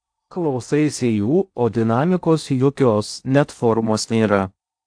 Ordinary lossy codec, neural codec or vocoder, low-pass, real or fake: AAC, 64 kbps; codec, 16 kHz in and 24 kHz out, 0.6 kbps, FocalCodec, streaming, 2048 codes; 9.9 kHz; fake